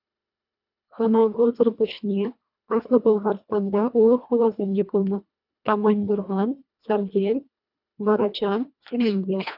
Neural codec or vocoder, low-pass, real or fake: codec, 24 kHz, 1.5 kbps, HILCodec; 5.4 kHz; fake